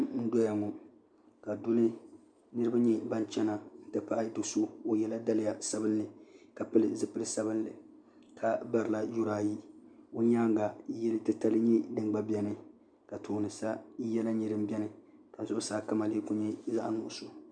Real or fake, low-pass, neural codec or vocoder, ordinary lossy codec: real; 9.9 kHz; none; MP3, 96 kbps